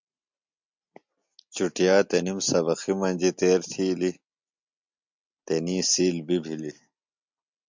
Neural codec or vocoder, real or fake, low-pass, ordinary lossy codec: none; real; 7.2 kHz; MP3, 64 kbps